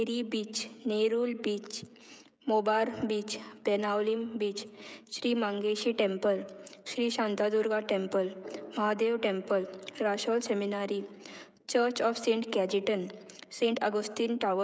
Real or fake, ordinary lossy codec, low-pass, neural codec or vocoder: fake; none; none; codec, 16 kHz, 16 kbps, FreqCodec, smaller model